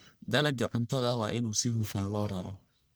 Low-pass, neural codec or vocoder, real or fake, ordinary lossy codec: none; codec, 44.1 kHz, 1.7 kbps, Pupu-Codec; fake; none